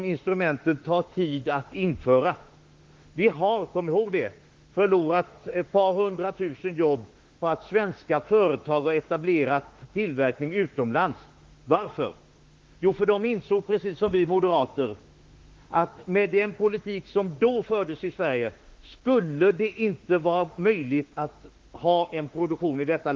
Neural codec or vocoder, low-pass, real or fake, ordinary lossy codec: autoencoder, 48 kHz, 32 numbers a frame, DAC-VAE, trained on Japanese speech; 7.2 kHz; fake; Opus, 16 kbps